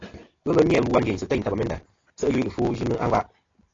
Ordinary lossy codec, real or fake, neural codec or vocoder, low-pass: AAC, 32 kbps; real; none; 7.2 kHz